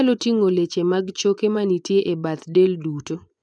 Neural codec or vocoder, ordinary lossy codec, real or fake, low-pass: vocoder, 24 kHz, 100 mel bands, Vocos; none; fake; 9.9 kHz